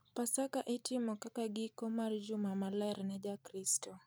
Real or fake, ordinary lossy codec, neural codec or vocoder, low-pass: fake; none; vocoder, 44.1 kHz, 128 mel bands every 256 samples, BigVGAN v2; none